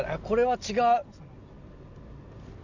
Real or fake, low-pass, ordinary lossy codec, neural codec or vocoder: real; 7.2 kHz; none; none